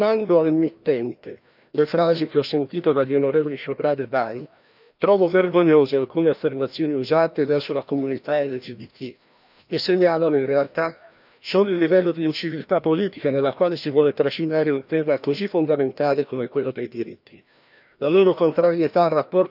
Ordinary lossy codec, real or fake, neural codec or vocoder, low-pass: none; fake; codec, 16 kHz, 1 kbps, FreqCodec, larger model; 5.4 kHz